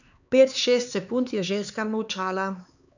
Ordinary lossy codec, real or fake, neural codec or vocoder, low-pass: none; fake; codec, 16 kHz, 4 kbps, X-Codec, HuBERT features, trained on LibriSpeech; 7.2 kHz